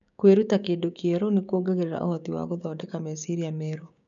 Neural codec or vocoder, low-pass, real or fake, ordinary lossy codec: codec, 16 kHz, 6 kbps, DAC; 7.2 kHz; fake; none